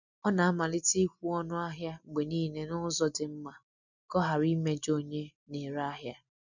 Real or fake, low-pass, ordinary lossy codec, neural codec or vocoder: real; 7.2 kHz; none; none